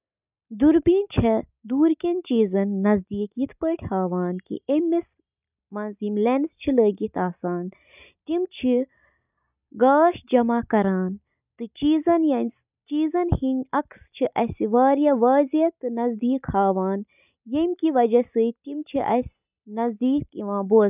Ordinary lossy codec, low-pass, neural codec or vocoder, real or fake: none; 3.6 kHz; none; real